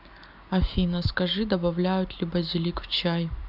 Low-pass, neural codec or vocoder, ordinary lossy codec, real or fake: 5.4 kHz; none; AAC, 48 kbps; real